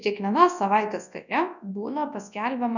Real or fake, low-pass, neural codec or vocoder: fake; 7.2 kHz; codec, 24 kHz, 0.9 kbps, WavTokenizer, large speech release